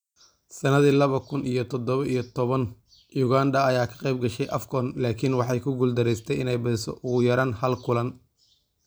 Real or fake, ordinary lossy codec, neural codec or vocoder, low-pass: real; none; none; none